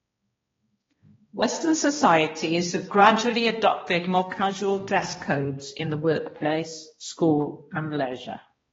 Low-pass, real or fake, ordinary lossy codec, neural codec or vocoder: 7.2 kHz; fake; AAC, 24 kbps; codec, 16 kHz, 1 kbps, X-Codec, HuBERT features, trained on balanced general audio